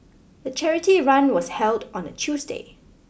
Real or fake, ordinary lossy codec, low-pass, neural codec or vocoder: real; none; none; none